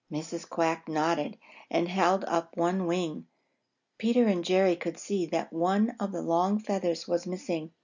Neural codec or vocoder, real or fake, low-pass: none; real; 7.2 kHz